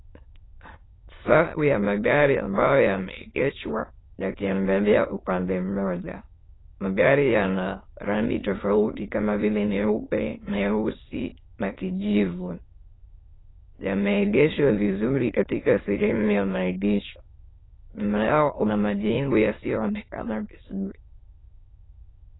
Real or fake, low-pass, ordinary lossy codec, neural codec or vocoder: fake; 7.2 kHz; AAC, 16 kbps; autoencoder, 22.05 kHz, a latent of 192 numbers a frame, VITS, trained on many speakers